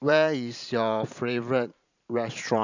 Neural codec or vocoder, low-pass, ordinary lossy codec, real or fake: vocoder, 44.1 kHz, 128 mel bands every 512 samples, BigVGAN v2; 7.2 kHz; none; fake